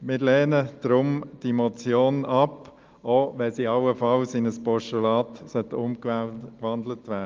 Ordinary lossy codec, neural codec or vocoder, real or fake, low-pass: Opus, 32 kbps; none; real; 7.2 kHz